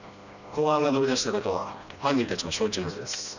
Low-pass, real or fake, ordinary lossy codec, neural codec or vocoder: 7.2 kHz; fake; none; codec, 16 kHz, 1 kbps, FreqCodec, smaller model